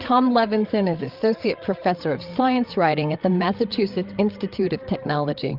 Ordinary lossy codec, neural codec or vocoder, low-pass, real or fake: Opus, 32 kbps; codec, 16 kHz, 8 kbps, FreqCodec, larger model; 5.4 kHz; fake